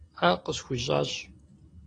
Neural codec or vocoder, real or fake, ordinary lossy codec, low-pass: none; real; AAC, 32 kbps; 9.9 kHz